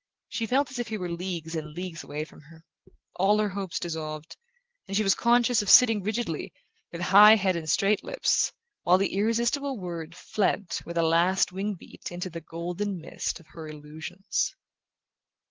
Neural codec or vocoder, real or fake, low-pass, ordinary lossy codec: none; real; 7.2 kHz; Opus, 16 kbps